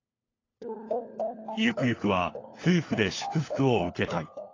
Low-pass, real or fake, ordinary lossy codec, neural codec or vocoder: 7.2 kHz; fake; AAC, 32 kbps; codec, 16 kHz, 4 kbps, FunCodec, trained on LibriTTS, 50 frames a second